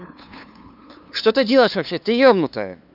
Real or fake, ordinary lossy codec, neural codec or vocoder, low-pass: fake; none; codec, 16 kHz, 2 kbps, FunCodec, trained on LibriTTS, 25 frames a second; 5.4 kHz